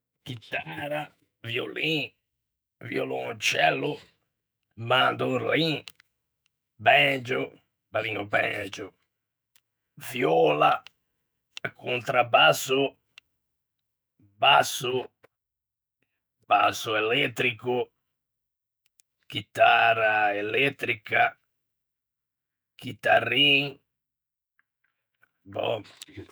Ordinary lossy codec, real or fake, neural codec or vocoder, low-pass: none; fake; autoencoder, 48 kHz, 128 numbers a frame, DAC-VAE, trained on Japanese speech; none